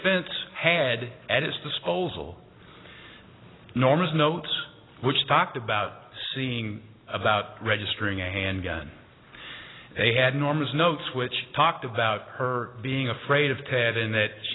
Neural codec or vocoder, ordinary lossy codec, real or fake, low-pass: none; AAC, 16 kbps; real; 7.2 kHz